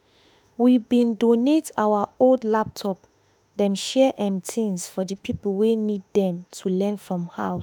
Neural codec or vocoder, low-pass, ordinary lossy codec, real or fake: autoencoder, 48 kHz, 32 numbers a frame, DAC-VAE, trained on Japanese speech; none; none; fake